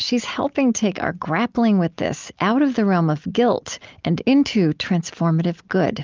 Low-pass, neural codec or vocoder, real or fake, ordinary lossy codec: 7.2 kHz; none; real; Opus, 32 kbps